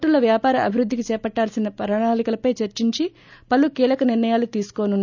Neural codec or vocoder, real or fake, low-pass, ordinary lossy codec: none; real; 7.2 kHz; none